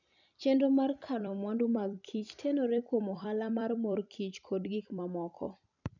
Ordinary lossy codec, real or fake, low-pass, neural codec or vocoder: none; fake; 7.2 kHz; vocoder, 44.1 kHz, 128 mel bands every 256 samples, BigVGAN v2